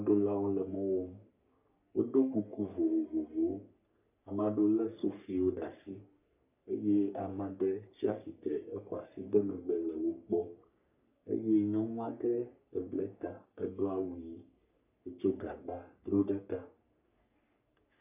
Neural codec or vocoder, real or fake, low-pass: codec, 44.1 kHz, 3.4 kbps, Pupu-Codec; fake; 3.6 kHz